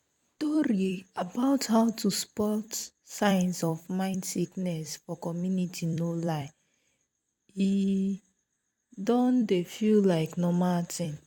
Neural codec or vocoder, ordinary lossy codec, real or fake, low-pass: vocoder, 44.1 kHz, 128 mel bands every 256 samples, BigVGAN v2; MP3, 96 kbps; fake; 19.8 kHz